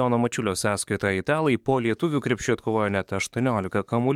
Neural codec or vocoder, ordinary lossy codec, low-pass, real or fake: codec, 44.1 kHz, 7.8 kbps, DAC; MP3, 96 kbps; 19.8 kHz; fake